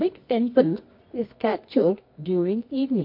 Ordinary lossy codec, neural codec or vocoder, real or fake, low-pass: MP3, 32 kbps; codec, 24 kHz, 0.9 kbps, WavTokenizer, medium music audio release; fake; 5.4 kHz